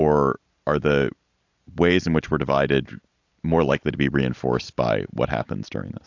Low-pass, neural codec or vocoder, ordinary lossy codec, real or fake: 7.2 kHz; none; AAC, 48 kbps; real